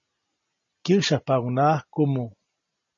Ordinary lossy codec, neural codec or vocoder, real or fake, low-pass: MP3, 32 kbps; none; real; 7.2 kHz